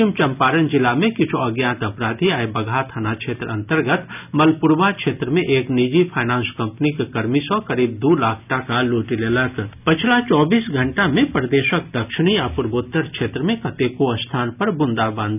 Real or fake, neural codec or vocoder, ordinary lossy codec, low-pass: real; none; none; 3.6 kHz